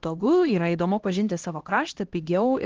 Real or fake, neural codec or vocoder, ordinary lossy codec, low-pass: fake; codec, 16 kHz, 1 kbps, X-Codec, HuBERT features, trained on LibriSpeech; Opus, 16 kbps; 7.2 kHz